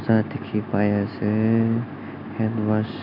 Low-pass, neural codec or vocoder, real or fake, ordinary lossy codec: 5.4 kHz; none; real; MP3, 48 kbps